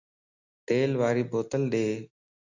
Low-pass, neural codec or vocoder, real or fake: 7.2 kHz; none; real